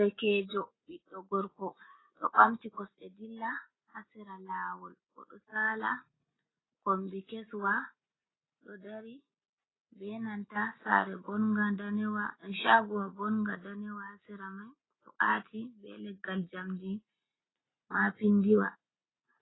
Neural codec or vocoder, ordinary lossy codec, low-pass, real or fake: none; AAC, 16 kbps; 7.2 kHz; real